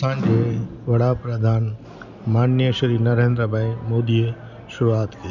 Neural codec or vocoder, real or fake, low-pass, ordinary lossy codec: none; real; 7.2 kHz; none